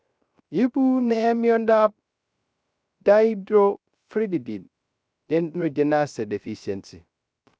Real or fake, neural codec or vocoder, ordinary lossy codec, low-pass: fake; codec, 16 kHz, 0.3 kbps, FocalCodec; none; none